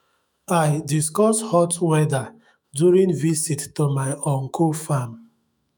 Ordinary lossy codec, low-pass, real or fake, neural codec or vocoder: none; none; fake; autoencoder, 48 kHz, 128 numbers a frame, DAC-VAE, trained on Japanese speech